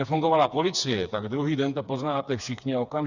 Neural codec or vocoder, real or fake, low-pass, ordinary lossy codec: codec, 16 kHz, 4 kbps, FreqCodec, smaller model; fake; 7.2 kHz; Opus, 64 kbps